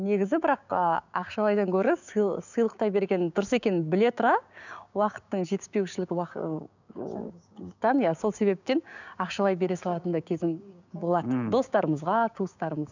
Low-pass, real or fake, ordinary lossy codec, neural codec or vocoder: 7.2 kHz; fake; none; codec, 16 kHz, 6 kbps, DAC